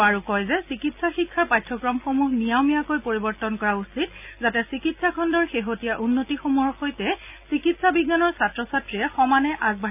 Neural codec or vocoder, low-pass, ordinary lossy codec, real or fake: none; 3.6 kHz; none; real